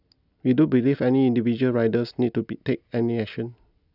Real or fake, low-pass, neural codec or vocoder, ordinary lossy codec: real; 5.4 kHz; none; none